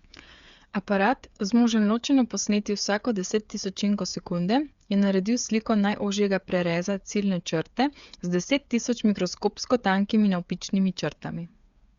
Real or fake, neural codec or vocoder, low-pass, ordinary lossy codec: fake; codec, 16 kHz, 8 kbps, FreqCodec, smaller model; 7.2 kHz; Opus, 64 kbps